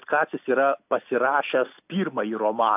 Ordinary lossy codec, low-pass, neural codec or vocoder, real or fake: AAC, 32 kbps; 3.6 kHz; none; real